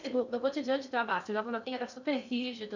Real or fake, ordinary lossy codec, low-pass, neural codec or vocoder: fake; none; 7.2 kHz; codec, 16 kHz in and 24 kHz out, 0.6 kbps, FocalCodec, streaming, 2048 codes